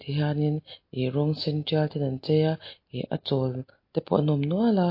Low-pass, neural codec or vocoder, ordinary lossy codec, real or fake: 5.4 kHz; none; MP3, 32 kbps; real